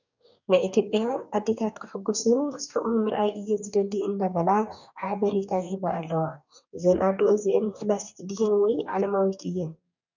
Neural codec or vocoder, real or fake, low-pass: codec, 44.1 kHz, 2.6 kbps, DAC; fake; 7.2 kHz